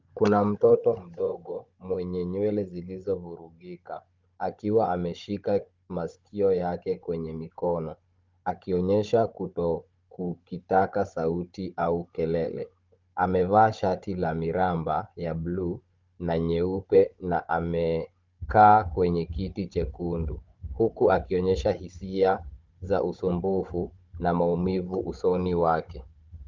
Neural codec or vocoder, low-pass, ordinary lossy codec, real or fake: codec, 16 kHz, 16 kbps, FunCodec, trained on Chinese and English, 50 frames a second; 7.2 kHz; Opus, 24 kbps; fake